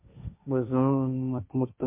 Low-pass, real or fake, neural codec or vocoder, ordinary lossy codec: 3.6 kHz; fake; codec, 16 kHz, 1.1 kbps, Voila-Tokenizer; MP3, 24 kbps